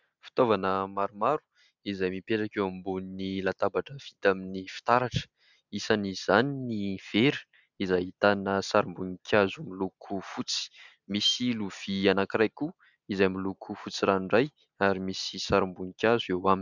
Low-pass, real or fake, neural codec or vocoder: 7.2 kHz; real; none